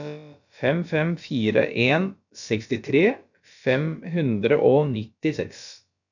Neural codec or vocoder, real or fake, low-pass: codec, 16 kHz, about 1 kbps, DyCAST, with the encoder's durations; fake; 7.2 kHz